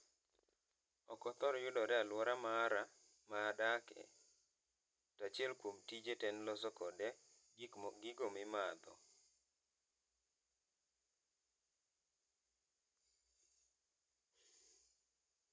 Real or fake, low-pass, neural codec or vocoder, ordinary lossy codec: real; none; none; none